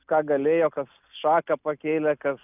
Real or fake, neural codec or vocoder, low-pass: real; none; 3.6 kHz